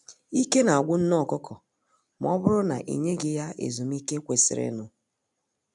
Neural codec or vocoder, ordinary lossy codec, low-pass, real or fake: none; none; 10.8 kHz; real